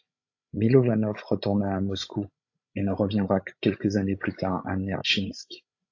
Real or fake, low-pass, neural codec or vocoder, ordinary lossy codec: fake; 7.2 kHz; codec, 16 kHz, 16 kbps, FreqCodec, larger model; AAC, 48 kbps